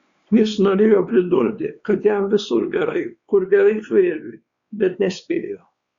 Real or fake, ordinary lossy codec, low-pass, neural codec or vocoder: fake; Opus, 64 kbps; 7.2 kHz; codec, 16 kHz, 2 kbps, X-Codec, WavLM features, trained on Multilingual LibriSpeech